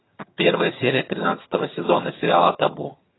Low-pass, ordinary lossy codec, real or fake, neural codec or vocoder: 7.2 kHz; AAC, 16 kbps; fake; vocoder, 22.05 kHz, 80 mel bands, HiFi-GAN